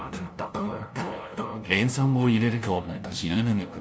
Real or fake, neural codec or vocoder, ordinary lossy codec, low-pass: fake; codec, 16 kHz, 0.5 kbps, FunCodec, trained on LibriTTS, 25 frames a second; none; none